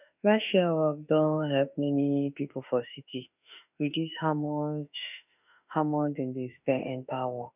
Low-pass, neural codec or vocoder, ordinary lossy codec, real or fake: 3.6 kHz; autoencoder, 48 kHz, 32 numbers a frame, DAC-VAE, trained on Japanese speech; none; fake